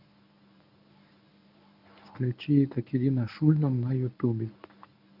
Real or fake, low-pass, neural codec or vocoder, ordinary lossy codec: fake; 5.4 kHz; codec, 24 kHz, 0.9 kbps, WavTokenizer, medium speech release version 1; none